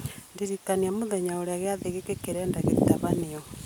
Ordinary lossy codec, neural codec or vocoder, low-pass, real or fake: none; none; none; real